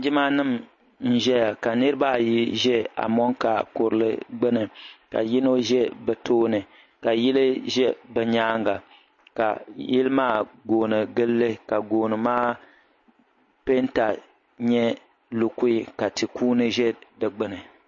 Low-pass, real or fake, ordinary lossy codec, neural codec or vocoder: 7.2 kHz; real; MP3, 32 kbps; none